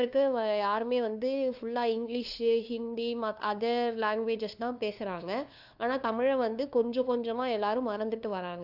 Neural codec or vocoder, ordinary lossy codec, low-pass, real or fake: codec, 16 kHz, 2 kbps, FunCodec, trained on LibriTTS, 25 frames a second; AAC, 48 kbps; 5.4 kHz; fake